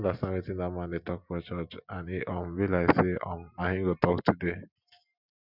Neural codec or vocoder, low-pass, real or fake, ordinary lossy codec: none; 5.4 kHz; real; none